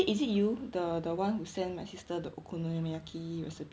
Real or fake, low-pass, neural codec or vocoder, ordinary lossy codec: real; none; none; none